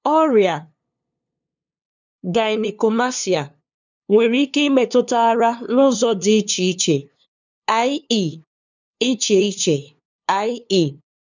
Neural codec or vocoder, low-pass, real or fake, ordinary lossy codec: codec, 16 kHz, 2 kbps, FunCodec, trained on LibriTTS, 25 frames a second; 7.2 kHz; fake; none